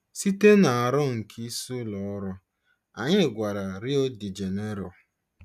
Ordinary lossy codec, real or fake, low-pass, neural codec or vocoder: none; real; 14.4 kHz; none